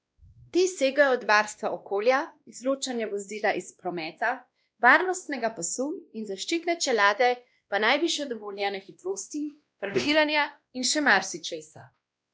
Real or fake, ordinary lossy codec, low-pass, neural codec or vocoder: fake; none; none; codec, 16 kHz, 1 kbps, X-Codec, WavLM features, trained on Multilingual LibriSpeech